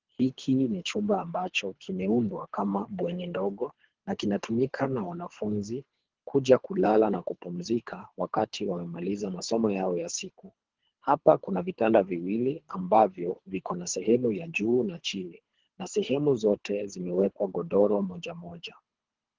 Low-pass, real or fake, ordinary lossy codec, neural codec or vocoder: 7.2 kHz; fake; Opus, 16 kbps; codec, 24 kHz, 3 kbps, HILCodec